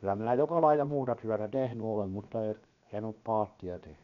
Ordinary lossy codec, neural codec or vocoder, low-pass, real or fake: none; codec, 16 kHz, 0.7 kbps, FocalCodec; 7.2 kHz; fake